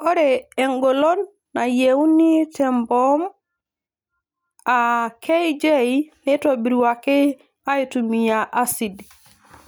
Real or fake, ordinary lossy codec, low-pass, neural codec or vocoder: real; none; none; none